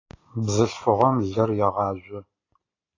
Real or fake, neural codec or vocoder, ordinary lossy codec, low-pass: real; none; AAC, 32 kbps; 7.2 kHz